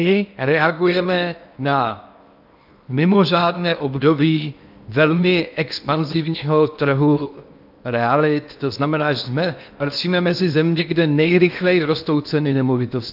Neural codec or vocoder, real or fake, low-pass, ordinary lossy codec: codec, 16 kHz in and 24 kHz out, 0.8 kbps, FocalCodec, streaming, 65536 codes; fake; 5.4 kHz; AAC, 48 kbps